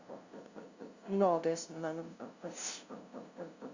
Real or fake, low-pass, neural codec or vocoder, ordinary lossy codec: fake; 7.2 kHz; codec, 16 kHz, 0.5 kbps, FunCodec, trained on LibriTTS, 25 frames a second; Opus, 64 kbps